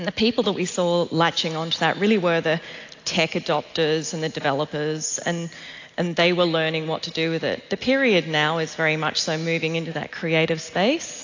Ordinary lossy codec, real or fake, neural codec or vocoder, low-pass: AAC, 48 kbps; real; none; 7.2 kHz